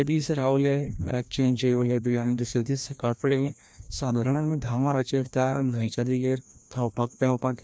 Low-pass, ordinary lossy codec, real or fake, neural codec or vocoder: none; none; fake; codec, 16 kHz, 1 kbps, FreqCodec, larger model